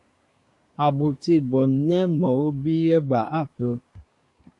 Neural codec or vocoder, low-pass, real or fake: codec, 24 kHz, 1 kbps, SNAC; 10.8 kHz; fake